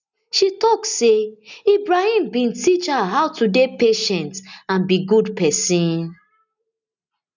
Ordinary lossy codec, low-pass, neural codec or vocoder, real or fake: none; 7.2 kHz; none; real